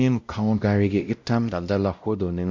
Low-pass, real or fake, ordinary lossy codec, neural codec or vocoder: 7.2 kHz; fake; MP3, 48 kbps; codec, 16 kHz, 0.5 kbps, X-Codec, WavLM features, trained on Multilingual LibriSpeech